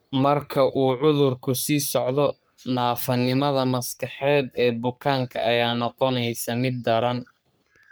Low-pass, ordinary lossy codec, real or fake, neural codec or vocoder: none; none; fake; codec, 44.1 kHz, 3.4 kbps, Pupu-Codec